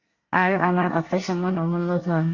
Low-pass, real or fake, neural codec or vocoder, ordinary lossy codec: 7.2 kHz; fake; codec, 24 kHz, 1 kbps, SNAC; Opus, 64 kbps